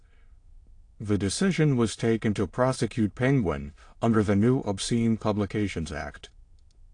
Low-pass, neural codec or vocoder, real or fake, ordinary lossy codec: 9.9 kHz; autoencoder, 22.05 kHz, a latent of 192 numbers a frame, VITS, trained on many speakers; fake; AAC, 48 kbps